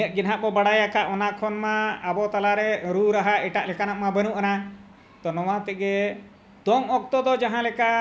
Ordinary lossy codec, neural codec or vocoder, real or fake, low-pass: none; none; real; none